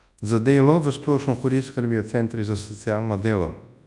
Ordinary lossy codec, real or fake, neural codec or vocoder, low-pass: none; fake; codec, 24 kHz, 0.9 kbps, WavTokenizer, large speech release; 10.8 kHz